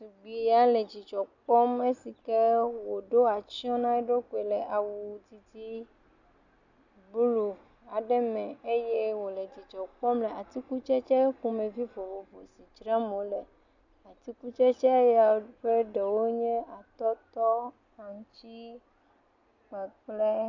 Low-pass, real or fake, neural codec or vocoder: 7.2 kHz; real; none